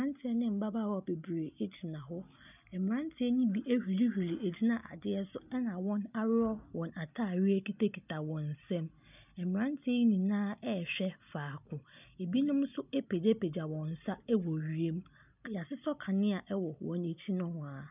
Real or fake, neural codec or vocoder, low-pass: real; none; 3.6 kHz